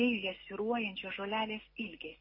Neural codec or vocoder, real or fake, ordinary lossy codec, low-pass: none; real; MP3, 24 kbps; 5.4 kHz